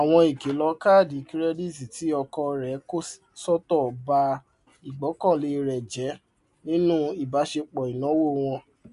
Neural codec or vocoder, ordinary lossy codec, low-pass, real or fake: none; MP3, 64 kbps; 10.8 kHz; real